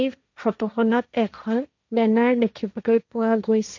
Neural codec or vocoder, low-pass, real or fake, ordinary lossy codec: codec, 16 kHz, 1.1 kbps, Voila-Tokenizer; 7.2 kHz; fake; none